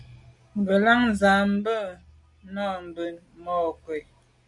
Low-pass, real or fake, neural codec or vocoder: 10.8 kHz; real; none